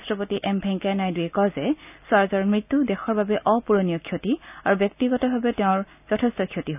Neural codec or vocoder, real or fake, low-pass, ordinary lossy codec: none; real; 3.6 kHz; none